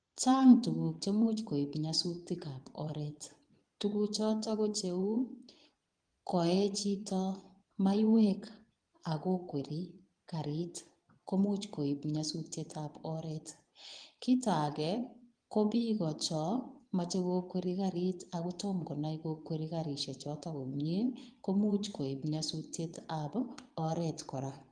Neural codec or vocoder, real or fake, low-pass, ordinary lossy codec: vocoder, 22.05 kHz, 80 mel bands, Vocos; fake; 9.9 kHz; Opus, 24 kbps